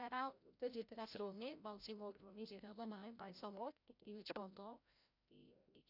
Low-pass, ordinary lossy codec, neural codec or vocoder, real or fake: 5.4 kHz; none; codec, 16 kHz, 0.5 kbps, FreqCodec, larger model; fake